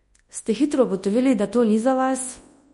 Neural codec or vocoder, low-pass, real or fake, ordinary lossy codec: codec, 24 kHz, 0.9 kbps, WavTokenizer, large speech release; 10.8 kHz; fake; MP3, 48 kbps